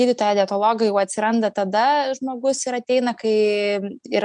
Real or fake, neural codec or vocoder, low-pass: real; none; 9.9 kHz